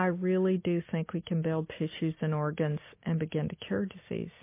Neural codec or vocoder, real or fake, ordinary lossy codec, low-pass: none; real; MP3, 24 kbps; 3.6 kHz